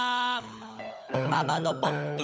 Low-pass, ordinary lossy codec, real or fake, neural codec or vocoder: none; none; fake; codec, 16 kHz, 16 kbps, FunCodec, trained on LibriTTS, 50 frames a second